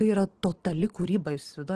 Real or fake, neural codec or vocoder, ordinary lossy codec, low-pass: real; none; Opus, 16 kbps; 9.9 kHz